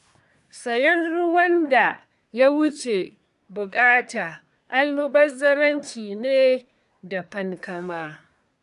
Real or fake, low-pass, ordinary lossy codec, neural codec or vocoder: fake; 10.8 kHz; none; codec, 24 kHz, 1 kbps, SNAC